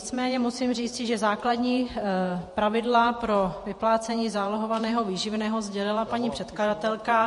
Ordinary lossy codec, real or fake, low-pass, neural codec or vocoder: MP3, 48 kbps; fake; 14.4 kHz; vocoder, 48 kHz, 128 mel bands, Vocos